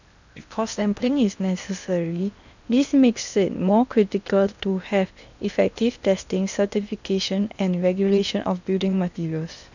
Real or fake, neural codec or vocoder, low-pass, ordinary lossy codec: fake; codec, 16 kHz in and 24 kHz out, 0.8 kbps, FocalCodec, streaming, 65536 codes; 7.2 kHz; none